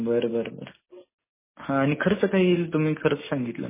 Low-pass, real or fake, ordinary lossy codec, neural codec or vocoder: 3.6 kHz; real; MP3, 16 kbps; none